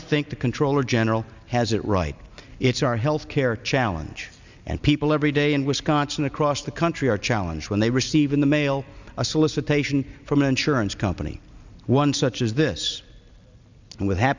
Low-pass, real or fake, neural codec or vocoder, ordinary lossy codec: 7.2 kHz; real; none; Opus, 64 kbps